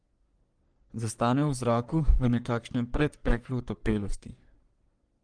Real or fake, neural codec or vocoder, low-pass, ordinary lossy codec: fake; codec, 44.1 kHz, 3.4 kbps, Pupu-Codec; 9.9 kHz; Opus, 24 kbps